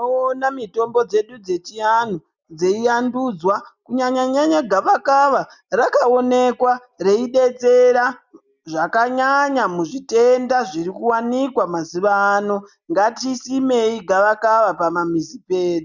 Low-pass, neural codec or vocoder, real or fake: 7.2 kHz; none; real